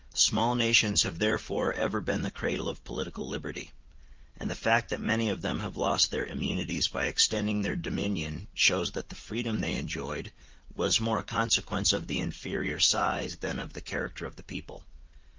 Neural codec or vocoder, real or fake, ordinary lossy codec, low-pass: vocoder, 44.1 kHz, 80 mel bands, Vocos; fake; Opus, 24 kbps; 7.2 kHz